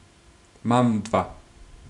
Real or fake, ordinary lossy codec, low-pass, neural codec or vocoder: fake; none; 10.8 kHz; vocoder, 44.1 kHz, 128 mel bands every 512 samples, BigVGAN v2